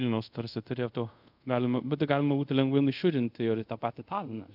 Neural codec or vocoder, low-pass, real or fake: codec, 24 kHz, 0.5 kbps, DualCodec; 5.4 kHz; fake